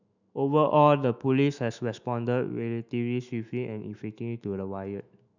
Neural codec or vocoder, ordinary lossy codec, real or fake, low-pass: autoencoder, 48 kHz, 128 numbers a frame, DAC-VAE, trained on Japanese speech; none; fake; 7.2 kHz